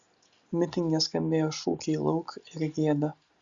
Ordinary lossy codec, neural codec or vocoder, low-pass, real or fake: Opus, 64 kbps; none; 7.2 kHz; real